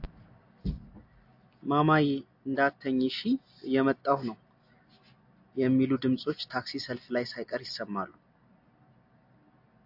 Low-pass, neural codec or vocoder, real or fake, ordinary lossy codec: 5.4 kHz; none; real; AAC, 48 kbps